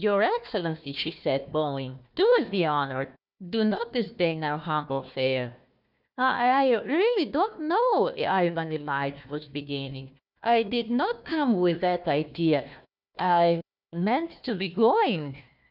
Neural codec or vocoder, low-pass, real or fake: codec, 16 kHz, 1 kbps, FunCodec, trained on Chinese and English, 50 frames a second; 5.4 kHz; fake